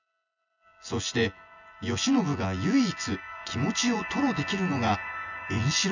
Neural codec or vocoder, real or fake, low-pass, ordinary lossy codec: vocoder, 24 kHz, 100 mel bands, Vocos; fake; 7.2 kHz; none